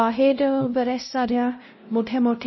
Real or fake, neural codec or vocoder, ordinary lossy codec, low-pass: fake; codec, 16 kHz, 0.5 kbps, X-Codec, WavLM features, trained on Multilingual LibriSpeech; MP3, 24 kbps; 7.2 kHz